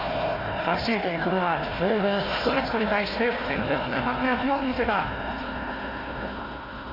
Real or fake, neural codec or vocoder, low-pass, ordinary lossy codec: fake; codec, 16 kHz, 1 kbps, FunCodec, trained on Chinese and English, 50 frames a second; 5.4 kHz; AAC, 24 kbps